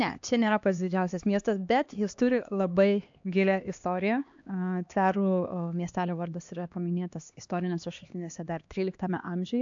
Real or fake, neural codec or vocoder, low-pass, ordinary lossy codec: fake; codec, 16 kHz, 2 kbps, X-Codec, HuBERT features, trained on LibriSpeech; 7.2 kHz; AAC, 64 kbps